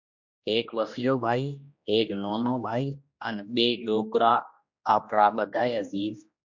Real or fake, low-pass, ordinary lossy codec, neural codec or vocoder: fake; 7.2 kHz; MP3, 48 kbps; codec, 16 kHz, 1 kbps, X-Codec, HuBERT features, trained on general audio